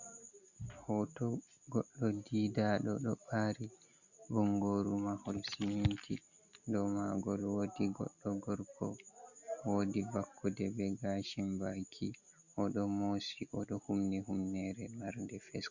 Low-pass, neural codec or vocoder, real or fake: 7.2 kHz; none; real